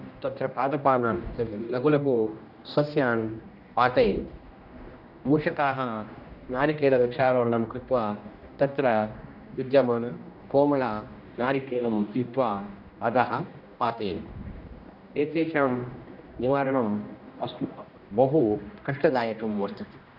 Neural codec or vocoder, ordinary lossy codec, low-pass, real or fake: codec, 16 kHz, 1 kbps, X-Codec, HuBERT features, trained on general audio; Opus, 64 kbps; 5.4 kHz; fake